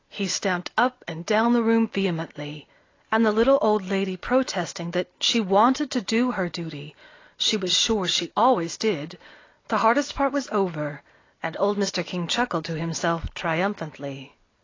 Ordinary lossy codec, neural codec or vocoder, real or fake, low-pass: AAC, 32 kbps; none; real; 7.2 kHz